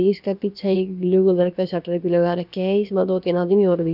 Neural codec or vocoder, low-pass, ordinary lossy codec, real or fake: codec, 16 kHz, about 1 kbps, DyCAST, with the encoder's durations; 5.4 kHz; none; fake